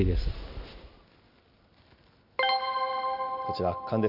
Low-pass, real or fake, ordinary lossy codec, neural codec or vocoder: 5.4 kHz; real; none; none